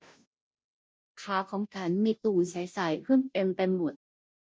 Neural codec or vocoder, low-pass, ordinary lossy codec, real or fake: codec, 16 kHz, 0.5 kbps, FunCodec, trained on Chinese and English, 25 frames a second; none; none; fake